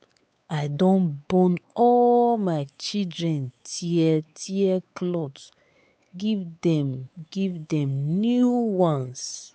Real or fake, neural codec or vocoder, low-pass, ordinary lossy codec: fake; codec, 16 kHz, 4 kbps, X-Codec, WavLM features, trained on Multilingual LibriSpeech; none; none